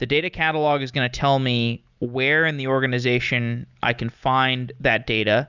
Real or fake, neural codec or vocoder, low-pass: real; none; 7.2 kHz